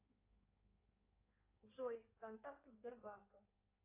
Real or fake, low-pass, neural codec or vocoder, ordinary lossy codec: fake; 3.6 kHz; codec, 16 kHz in and 24 kHz out, 1.1 kbps, FireRedTTS-2 codec; AAC, 24 kbps